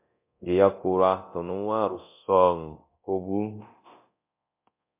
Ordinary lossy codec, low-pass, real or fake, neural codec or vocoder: MP3, 32 kbps; 3.6 kHz; fake; codec, 24 kHz, 0.9 kbps, DualCodec